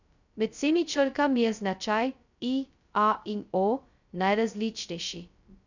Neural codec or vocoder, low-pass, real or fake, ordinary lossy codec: codec, 16 kHz, 0.2 kbps, FocalCodec; 7.2 kHz; fake; none